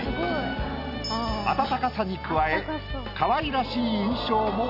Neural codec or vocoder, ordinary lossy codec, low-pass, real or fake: none; none; 5.4 kHz; real